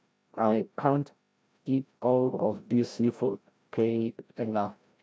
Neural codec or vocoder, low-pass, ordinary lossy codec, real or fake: codec, 16 kHz, 0.5 kbps, FreqCodec, larger model; none; none; fake